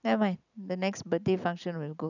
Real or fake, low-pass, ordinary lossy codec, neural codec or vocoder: fake; 7.2 kHz; none; vocoder, 44.1 kHz, 128 mel bands every 256 samples, BigVGAN v2